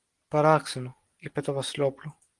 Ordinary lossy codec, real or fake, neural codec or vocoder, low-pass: Opus, 24 kbps; real; none; 10.8 kHz